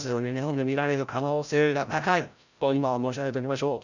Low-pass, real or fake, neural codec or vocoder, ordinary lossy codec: 7.2 kHz; fake; codec, 16 kHz, 0.5 kbps, FreqCodec, larger model; none